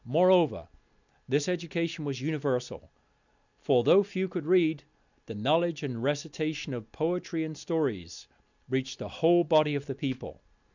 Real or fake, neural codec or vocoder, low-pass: real; none; 7.2 kHz